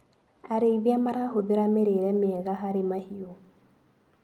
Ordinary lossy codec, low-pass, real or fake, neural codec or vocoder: Opus, 32 kbps; 19.8 kHz; real; none